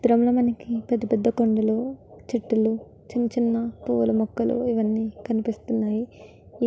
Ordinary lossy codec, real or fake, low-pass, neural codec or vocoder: none; real; none; none